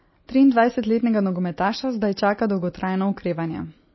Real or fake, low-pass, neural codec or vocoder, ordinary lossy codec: real; 7.2 kHz; none; MP3, 24 kbps